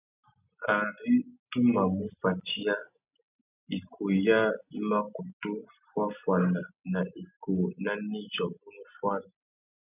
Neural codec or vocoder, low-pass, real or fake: none; 3.6 kHz; real